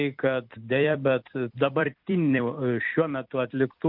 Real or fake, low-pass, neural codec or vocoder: fake; 5.4 kHz; vocoder, 44.1 kHz, 128 mel bands every 256 samples, BigVGAN v2